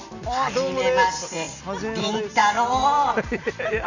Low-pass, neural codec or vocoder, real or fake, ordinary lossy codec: 7.2 kHz; none; real; none